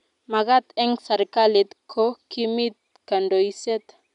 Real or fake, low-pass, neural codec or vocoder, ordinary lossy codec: real; 10.8 kHz; none; none